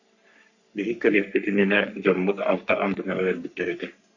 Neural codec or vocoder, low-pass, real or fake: codec, 44.1 kHz, 3.4 kbps, Pupu-Codec; 7.2 kHz; fake